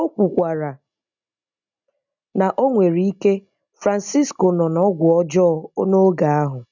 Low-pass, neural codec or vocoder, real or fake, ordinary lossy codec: 7.2 kHz; none; real; none